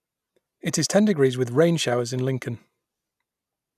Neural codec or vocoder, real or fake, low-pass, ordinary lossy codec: none; real; 14.4 kHz; none